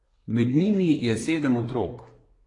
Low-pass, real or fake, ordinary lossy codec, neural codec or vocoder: 10.8 kHz; fake; AAC, 32 kbps; codec, 24 kHz, 1 kbps, SNAC